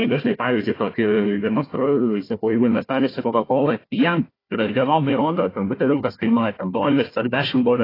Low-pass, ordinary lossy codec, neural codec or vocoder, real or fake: 5.4 kHz; AAC, 24 kbps; codec, 16 kHz, 1 kbps, FunCodec, trained on Chinese and English, 50 frames a second; fake